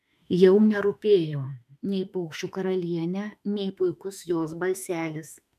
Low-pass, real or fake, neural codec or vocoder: 14.4 kHz; fake; autoencoder, 48 kHz, 32 numbers a frame, DAC-VAE, trained on Japanese speech